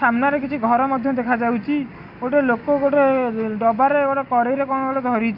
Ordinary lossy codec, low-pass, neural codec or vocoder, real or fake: none; 5.4 kHz; none; real